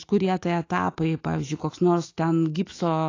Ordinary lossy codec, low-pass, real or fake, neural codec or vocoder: AAC, 32 kbps; 7.2 kHz; fake; autoencoder, 48 kHz, 128 numbers a frame, DAC-VAE, trained on Japanese speech